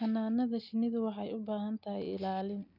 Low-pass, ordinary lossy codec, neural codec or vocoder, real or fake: 5.4 kHz; none; none; real